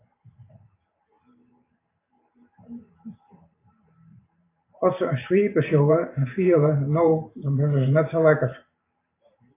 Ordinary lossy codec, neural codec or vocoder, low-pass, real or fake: AAC, 24 kbps; vocoder, 44.1 kHz, 128 mel bands every 512 samples, BigVGAN v2; 3.6 kHz; fake